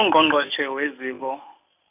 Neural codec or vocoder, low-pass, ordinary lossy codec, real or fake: none; 3.6 kHz; none; real